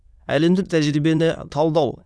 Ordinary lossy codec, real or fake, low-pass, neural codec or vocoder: none; fake; none; autoencoder, 22.05 kHz, a latent of 192 numbers a frame, VITS, trained on many speakers